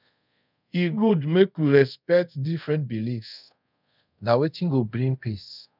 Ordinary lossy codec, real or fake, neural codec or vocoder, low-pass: none; fake; codec, 24 kHz, 0.5 kbps, DualCodec; 5.4 kHz